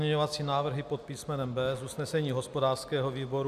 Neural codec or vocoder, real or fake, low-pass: none; real; 14.4 kHz